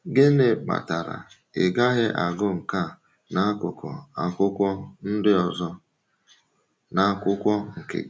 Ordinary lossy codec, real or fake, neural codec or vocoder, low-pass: none; real; none; none